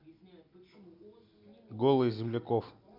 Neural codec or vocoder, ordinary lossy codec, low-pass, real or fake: none; MP3, 48 kbps; 5.4 kHz; real